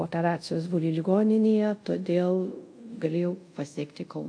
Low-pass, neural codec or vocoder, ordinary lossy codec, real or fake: 9.9 kHz; codec, 24 kHz, 0.5 kbps, DualCodec; AAC, 64 kbps; fake